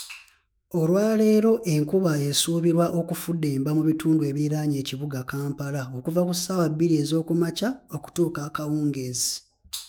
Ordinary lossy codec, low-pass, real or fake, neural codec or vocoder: none; none; fake; autoencoder, 48 kHz, 128 numbers a frame, DAC-VAE, trained on Japanese speech